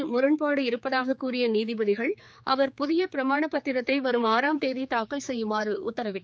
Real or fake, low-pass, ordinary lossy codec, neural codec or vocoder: fake; none; none; codec, 16 kHz, 4 kbps, X-Codec, HuBERT features, trained on general audio